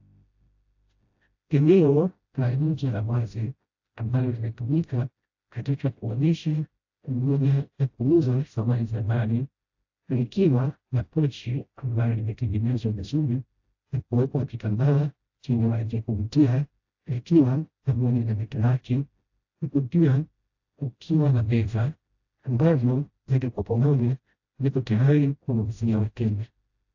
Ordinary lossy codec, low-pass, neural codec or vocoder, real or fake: AAC, 48 kbps; 7.2 kHz; codec, 16 kHz, 0.5 kbps, FreqCodec, smaller model; fake